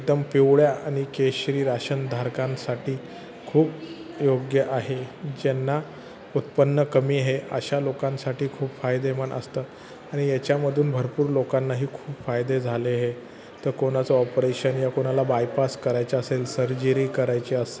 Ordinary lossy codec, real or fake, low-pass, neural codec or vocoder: none; real; none; none